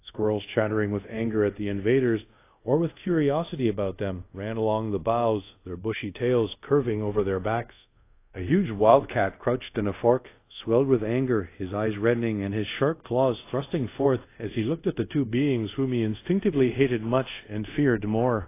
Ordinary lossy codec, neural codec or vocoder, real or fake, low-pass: AAC, 24 kbps; codec, 24 kHz, 0.5 kbps, DualCodec; fake; 3.6 kHz